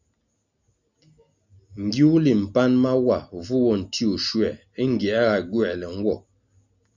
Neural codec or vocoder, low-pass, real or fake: none; 7.2 kHz; real